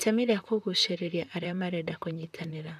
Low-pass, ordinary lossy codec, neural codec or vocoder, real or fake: 14.4 kHz; none; vocoder, 44.1 kHz, 128 mel bands, Pupu-Vocoder; fake